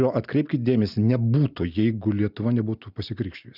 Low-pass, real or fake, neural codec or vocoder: 5.4 kHz; real; none